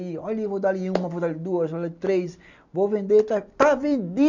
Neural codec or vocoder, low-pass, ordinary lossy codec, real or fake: none; 7.2 kHz; none; real